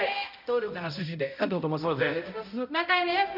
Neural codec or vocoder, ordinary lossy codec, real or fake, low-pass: codec, 16 kHz, 0.5 kbps, X-Codec, HuBERT features, trained on balanced general audio; none; fake; 5.4 kHz